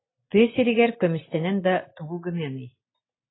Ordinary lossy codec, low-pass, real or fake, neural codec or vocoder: AAC, 16 kbps; 7.2 kHz; real; none